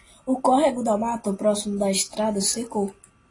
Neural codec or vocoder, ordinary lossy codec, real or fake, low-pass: none; AAC, 32 kbps; real; 10.8 kHz